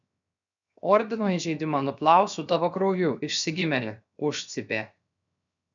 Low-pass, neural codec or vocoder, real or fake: 7.2 kHz; codec, 16 kHz, 0.7 kbps, FocalCodec; fake